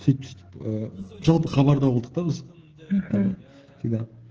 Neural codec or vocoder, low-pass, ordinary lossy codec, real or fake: codec, 16 kHz, 16 kbps, FreqCodec, smaller model; 7.2 kHz; Opus, 16 kbps; fake